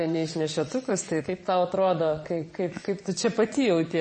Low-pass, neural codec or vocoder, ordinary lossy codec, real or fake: 9.9 kHz; vocoder, 22.05 kHz, 80 mel bands, Vocos; MP3, 32 kbps; fake